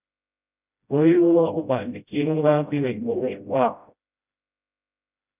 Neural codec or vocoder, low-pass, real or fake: codec, 16 kHz, 0.5 kbps, FreqCodec, smaller model; 3.6 kHz; fake